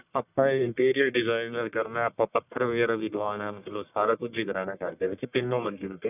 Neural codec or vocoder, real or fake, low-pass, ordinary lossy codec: codec, 44.1 kHz, 1.7 kbps, Pupu-Codec; fake; 3.6 kHz; none